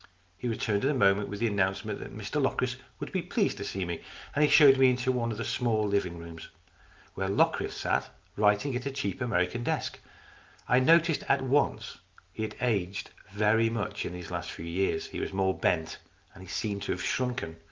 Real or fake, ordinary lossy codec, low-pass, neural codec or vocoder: real; Opus, 32 kbps; 7.2 kHz; none